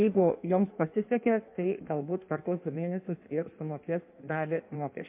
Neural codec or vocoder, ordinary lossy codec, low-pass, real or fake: codec, 16 kHz in and 24 kHz out, 1.1 kbps, FireRedTTS-2 codec; MP3, 32 kbps; 3.6 kHz; fake